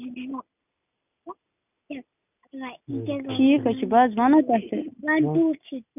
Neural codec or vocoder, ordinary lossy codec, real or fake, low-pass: none; none; real; 3.6 kHz